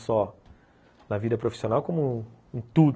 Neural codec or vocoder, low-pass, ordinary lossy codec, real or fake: none; none; none; real